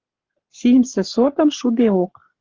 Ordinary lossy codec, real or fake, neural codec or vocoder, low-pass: Opus, 16 kbps; fake; codec, 44.1 kHz, 7.8 kbps, Pupu-Codec; 7.2 kHz